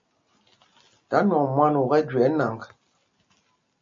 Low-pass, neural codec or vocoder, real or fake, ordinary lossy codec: 7.2 kHz; none; real; MP3, 32 kbps